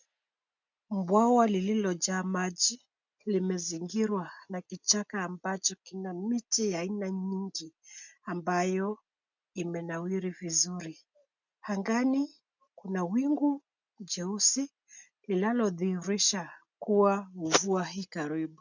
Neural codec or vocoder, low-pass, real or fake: none; 7.2 kHz; real